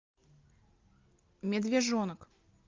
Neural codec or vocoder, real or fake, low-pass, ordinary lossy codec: none; real; 7.2 kHz; Opus, 24 kbps